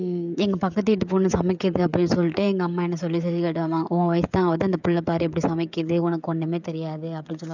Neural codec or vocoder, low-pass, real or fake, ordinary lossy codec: codec, 16 kHz, 16 kbps, FreqCodec, smaller model; 7.2 kHz; fake; none